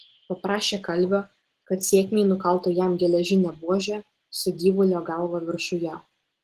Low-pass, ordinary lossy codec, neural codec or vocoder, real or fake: 14.4 kHz; Opus, 16 kbps; none; real